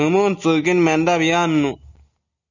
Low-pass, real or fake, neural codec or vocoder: 7.2 kHz; real; none